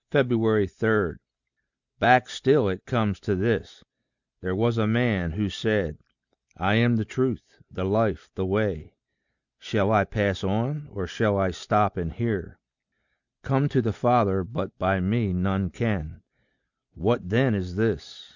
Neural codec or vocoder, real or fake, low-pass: none; real; 7.2 kHz